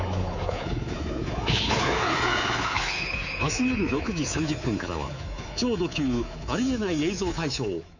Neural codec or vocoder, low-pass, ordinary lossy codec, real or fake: codec, 24 kHz, 3.1 kbps, DualCodec; 7.2 kHz; none; fake